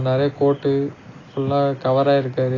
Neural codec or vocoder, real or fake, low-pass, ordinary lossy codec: none; real; 7.2 kHz; AAC, 32 kbps